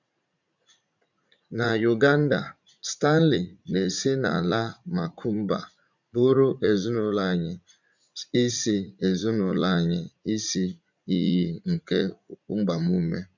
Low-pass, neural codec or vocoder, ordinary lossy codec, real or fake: 7.2 kHz; vocoder, 44.1 kHz, 80 mel bands, Vocos; none; fake